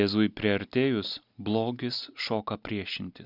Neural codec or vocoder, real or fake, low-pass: none; real; 5.4 kHz